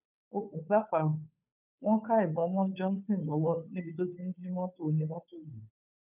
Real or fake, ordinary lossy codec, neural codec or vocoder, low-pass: fake; none; codec, 16 kHz, 2 kbps, FunCodec, trained on Chinese and English, 25 frames a second; 3.6 kHz